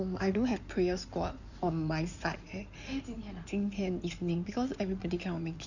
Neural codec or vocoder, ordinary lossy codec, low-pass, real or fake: codec, 16 kHz in and 24 kHz out, 2.2 kbps, FireRedTTS-2 codec; none; 7.2 kHz; fake